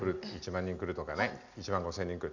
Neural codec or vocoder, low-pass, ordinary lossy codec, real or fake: none; 7.2 kHz; none; real